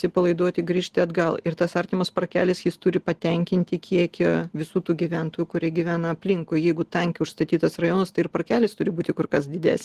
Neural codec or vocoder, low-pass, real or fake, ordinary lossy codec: none; 14.4 kHz; real; Opus, 16 kbps